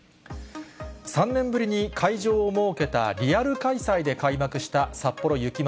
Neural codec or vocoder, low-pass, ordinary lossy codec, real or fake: none; none; none; real